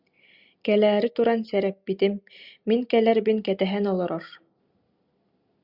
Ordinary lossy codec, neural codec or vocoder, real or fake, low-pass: Opus, 64 kbps; none; real; 5.4 kHz